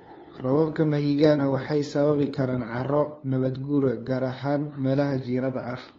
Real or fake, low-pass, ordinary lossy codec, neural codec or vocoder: fake; 7.2 kHz; AAC, 32 kbps; codec, 16 kHz, 2 kbps, FunCodec, trained on LibriTTS, 25 frames a second